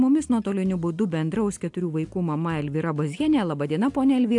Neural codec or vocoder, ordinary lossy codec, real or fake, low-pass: none; AAC, 64 kbps; real; 10.8 kHz